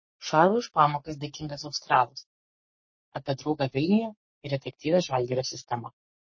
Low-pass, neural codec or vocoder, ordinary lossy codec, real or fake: 7.2 kHz; codec, 44.1 kHz, 3.4 kbps, Pupu-Codec; MP3, 32 kbps; fake